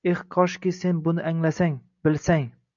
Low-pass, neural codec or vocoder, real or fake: 7.2 kHz; none; real